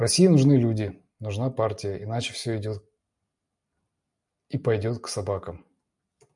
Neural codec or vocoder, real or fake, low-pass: none; real; 10.8 kHz